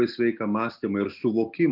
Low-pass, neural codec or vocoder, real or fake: 5.4 kHz; none; real